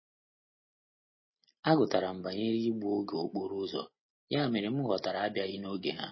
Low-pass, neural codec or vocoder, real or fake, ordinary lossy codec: 7.2 kHz; none; real; MP3, 24 kbps